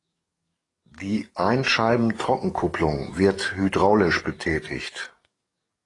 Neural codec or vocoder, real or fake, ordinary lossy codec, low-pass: codec, 44.1 kHz, 7.8 kbps, DAC; fake; AAC, 32 kbps; 10.8 kHz